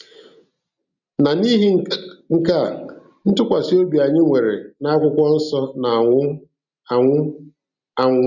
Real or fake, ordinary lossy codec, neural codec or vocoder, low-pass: real; none; none; 7.2 kHz